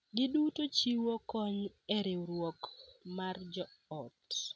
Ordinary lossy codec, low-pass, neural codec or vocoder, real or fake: none; none; none; real